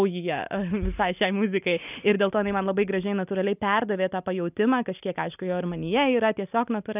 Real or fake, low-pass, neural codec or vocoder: real; 3.6 kHz; none